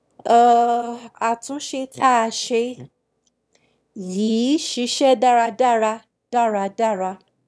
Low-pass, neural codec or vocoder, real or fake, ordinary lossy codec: none; autoencoder, 22.05 kHz, a latent of 192 numbers a frame, VITS, trained on one speaker; fake; none